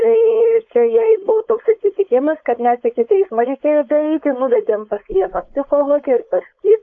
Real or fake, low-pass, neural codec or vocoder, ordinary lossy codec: fake; 7.2 kHz; codec, 16 kHz, 4.8 kbps, FACodec; AAC, 48 kbps